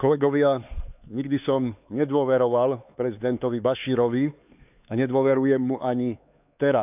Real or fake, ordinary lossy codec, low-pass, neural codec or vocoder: fake; none; 3.6 kHz; codec, 16 kHz, 4 kbps, X-Codec, HuBERT features, trained on LibriSpeech